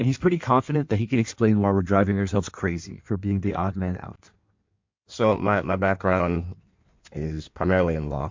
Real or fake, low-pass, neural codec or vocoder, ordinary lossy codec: fake; 7.2 kHz; codec, 16 kHz in and 24 kHz out, 1.1 kbps, FireRedTTS-2 codec; MP3, 48 kbps